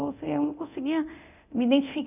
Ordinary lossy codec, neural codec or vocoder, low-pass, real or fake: none; codec, 24 kHz, 0.9 kbps, DualCodec; 3.6 kHz; fake